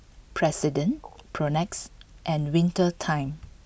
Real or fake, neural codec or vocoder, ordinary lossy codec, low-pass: real; none; none; none